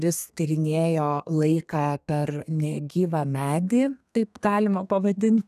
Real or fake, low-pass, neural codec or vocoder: fake; 14.4 kHz; codec, 32 kHz, 1.9 kbps, SNAC